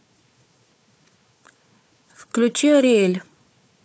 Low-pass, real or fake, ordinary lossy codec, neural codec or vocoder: none; fake; none; codec, 16 kHz, 4 kbps, FunCodec, trained on Chinese and English, 50 frames a second